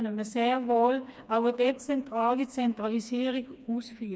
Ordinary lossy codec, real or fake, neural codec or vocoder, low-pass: none; fake; codec, 16 kHz, 2 kbps, FreqCodec, smaller model; none